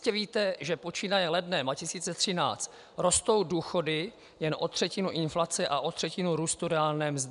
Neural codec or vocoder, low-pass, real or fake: none; 10.8 kHz; real